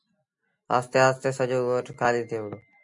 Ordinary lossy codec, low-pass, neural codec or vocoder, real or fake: MP3, 96 kbps; 10.8 kHz; none; real